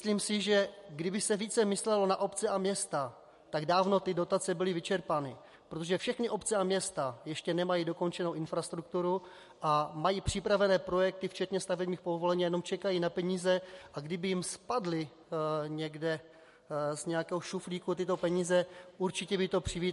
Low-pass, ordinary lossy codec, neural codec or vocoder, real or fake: 14.4 kHz; MP3, 48 kbps; none; real